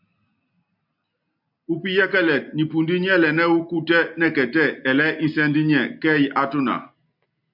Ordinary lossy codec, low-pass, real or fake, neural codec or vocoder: MP3, 48 kbps; 5.4 kHz; real; none